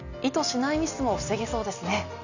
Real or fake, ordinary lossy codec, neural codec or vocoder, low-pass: real; none; none; 7.2 kHz